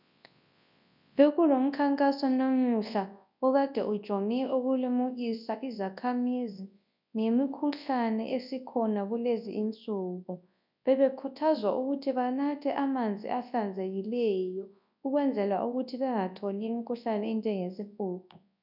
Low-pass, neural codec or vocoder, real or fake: 5.4 kHz; codec, 24 kHz, 0.9 kbps, WavTokenizer, large speech release; fake